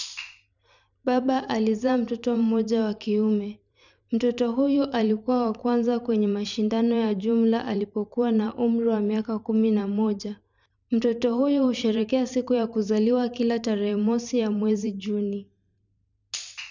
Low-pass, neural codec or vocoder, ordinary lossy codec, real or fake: 7.2 kHz; vocoder, 44.1 kHz, 128 mel bands every 512 samples, BigVGAN v2; none; fake